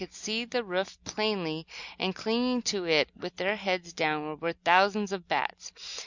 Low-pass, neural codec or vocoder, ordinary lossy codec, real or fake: 7.2 kHz; none; Opus, 64 kbps; real